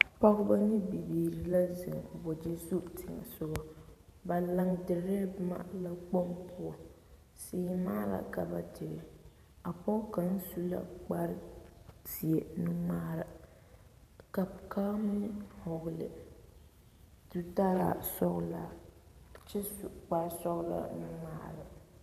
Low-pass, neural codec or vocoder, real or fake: 14.4 kHz; vocoder, 44.1 kHz, 128 mel bands every 512 samples, BigVGAN v2; fake